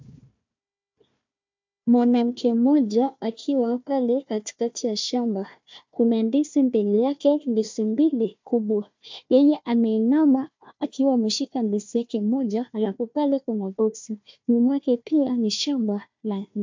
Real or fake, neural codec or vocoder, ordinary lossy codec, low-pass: fake; codec, 16 kHz, 1 kbps, FunCodec, trained on Chinese and English, 50 frames a second; MP3, 64 kbps; 7.2 kHz